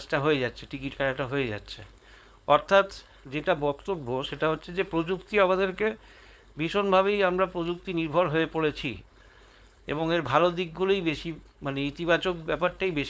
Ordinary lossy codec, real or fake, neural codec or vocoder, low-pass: none; fake; codec, 16 kHz, 4.8 kbps, FACodec; none